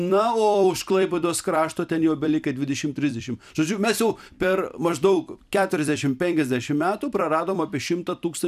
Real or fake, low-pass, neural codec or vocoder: fake; 14.4 kHz; vocoder, 44.1 kHz, 128 mel bands every 256 samples, BigVGAN v2